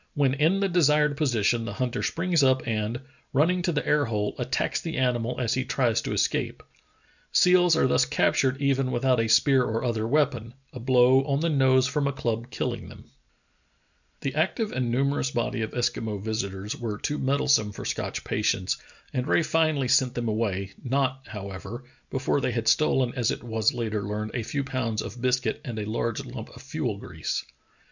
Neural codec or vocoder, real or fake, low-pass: none; real; 7.2 kHz